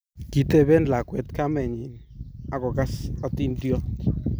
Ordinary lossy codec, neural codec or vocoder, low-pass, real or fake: none; none; none; real